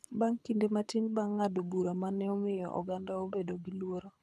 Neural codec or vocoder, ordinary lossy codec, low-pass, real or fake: codec, 24 kHz, 6 kbps, HILCodec; none; none; fake